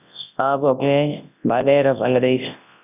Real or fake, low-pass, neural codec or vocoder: fake; 3.6 kHz; codec, 24 kHz, 0.9 kbps, WavTokenizer, large speech release